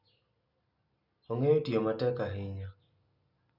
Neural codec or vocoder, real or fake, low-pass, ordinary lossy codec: none; real; 5.4 kHz; none